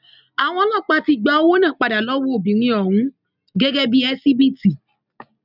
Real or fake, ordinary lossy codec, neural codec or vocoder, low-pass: real; none; none; 5.4 kHz